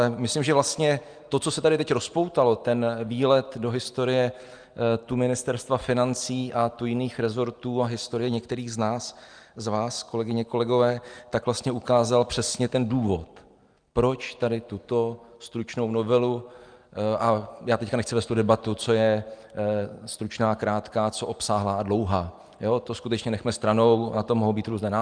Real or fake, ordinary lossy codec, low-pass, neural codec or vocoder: real; Opus, 32 kbps; 9.9 kHz; none